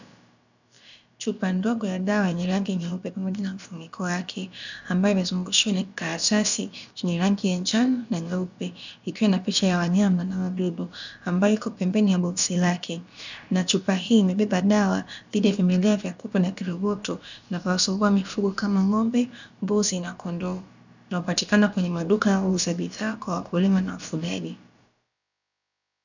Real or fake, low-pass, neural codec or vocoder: fake; 7.2 kHz; codec, 16 kHz, about 1 kbps, DyCAST, with the encoder's durations